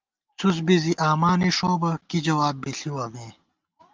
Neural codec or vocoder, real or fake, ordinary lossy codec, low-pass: none; real; Opus, 32 kbps; 7.2 kHz